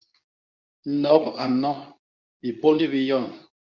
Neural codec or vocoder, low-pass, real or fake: codec, 24 kHz, 0.9 kbps, WavTokenizer, medium speech release version 2; 7.2 kHz; fake